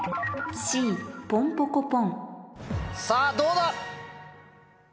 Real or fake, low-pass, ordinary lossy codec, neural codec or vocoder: real; none; none; none